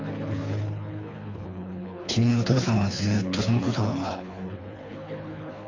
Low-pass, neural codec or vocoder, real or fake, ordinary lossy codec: 7.2 kHz; codec, 24 kHz, 3 kbps, HILCodec; fake; AAC, 48 kbps